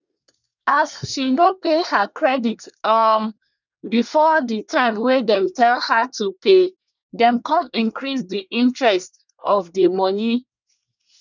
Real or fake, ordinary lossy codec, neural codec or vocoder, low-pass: fake; none; codec, 24 kHz, 1 kbps, SNAC; 7.2 kHz